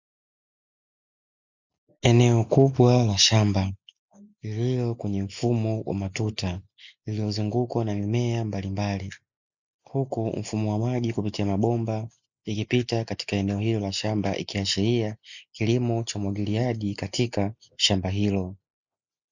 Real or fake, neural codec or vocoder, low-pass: real; none; 7.2 kHz